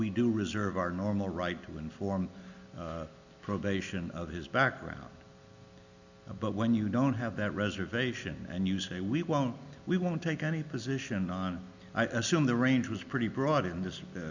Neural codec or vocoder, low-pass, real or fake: none; 7.2 kHz; real